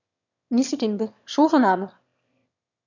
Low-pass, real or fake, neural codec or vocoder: 7.2 kHz; fake; autoencoder, 22.05 kHz, a latent of 192 numbers a frame, VITS, trained on one speaker